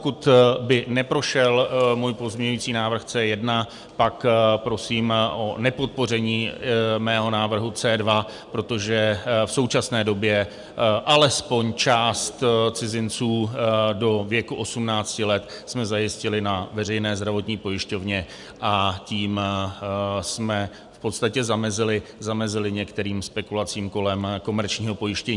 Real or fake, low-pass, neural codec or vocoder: real; 10.8 kHz; none